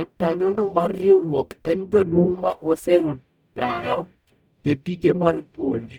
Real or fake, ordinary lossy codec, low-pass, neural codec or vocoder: fake; none; 19.8 kHz; codec, 44.1 kHz, 0.9 kbps, DAC